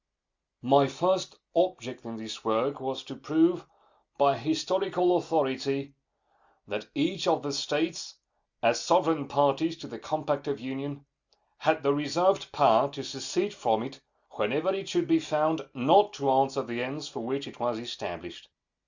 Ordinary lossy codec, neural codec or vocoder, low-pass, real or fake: Opus, 64 kbps; none; 7.2 kHz; real